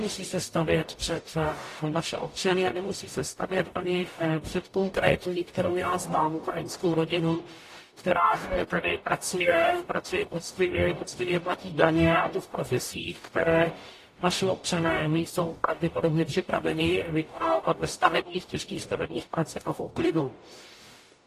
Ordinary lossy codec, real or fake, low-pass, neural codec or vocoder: AAC, 48 kbps; fake; 14.4 kHz; codec, 44.1 kHz, 0.9 kbps, DAC